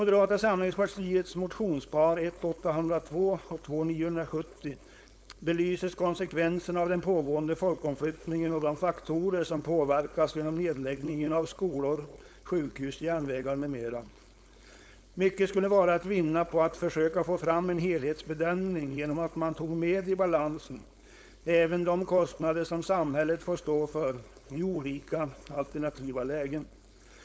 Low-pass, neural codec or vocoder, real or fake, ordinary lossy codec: none; codec, 16 kHz, 4.8 kbps, FACodec; fake; none